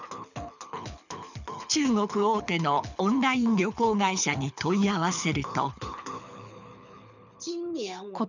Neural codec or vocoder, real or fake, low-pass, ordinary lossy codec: codec, 24 kHz, 6 kbps, HILCodec; fake; 7.2 kHz; none